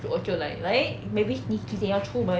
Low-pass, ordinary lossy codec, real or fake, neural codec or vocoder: none; none; real; none